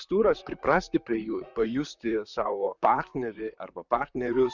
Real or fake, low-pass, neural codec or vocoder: fake; 7.2 kHz; vocoder, 44.1 kHz, 128 mel bands, Pupu-Vocoder